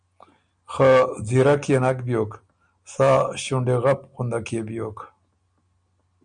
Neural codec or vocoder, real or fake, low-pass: none; real; 9.9 kHz